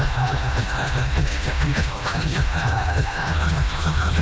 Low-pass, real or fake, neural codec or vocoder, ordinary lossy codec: none; fake; codec, 16 kHz, 1 kbps, FreqCodec, smaller model; none